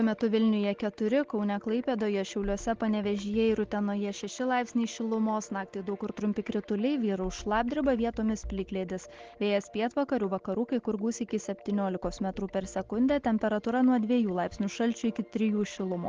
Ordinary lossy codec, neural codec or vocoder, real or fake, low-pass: Opus, 16 kbps; none; real; 7.2 kHz